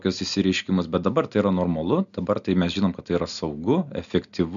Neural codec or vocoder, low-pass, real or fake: none; 7.2 kHz; real